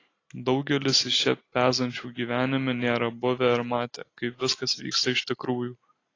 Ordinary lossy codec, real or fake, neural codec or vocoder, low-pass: AAC, 32 kbps; real; none; 7.2 kHz